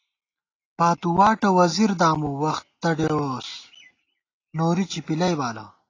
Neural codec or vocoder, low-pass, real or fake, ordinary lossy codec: none; 7.2 kHz; real; AAC, 32 kbps